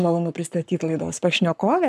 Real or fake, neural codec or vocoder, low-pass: fake; codec, 44.1 kHz, 7.8 kbps, Pupu-Codec; 14.4 kHz